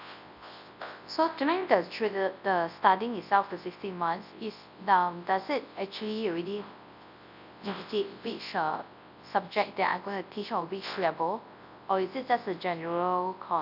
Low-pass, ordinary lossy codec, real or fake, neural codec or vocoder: 5.4 kHz; none; fake; codec, 24 kHz, 0.9 kbps, WavTokenizer, large speech release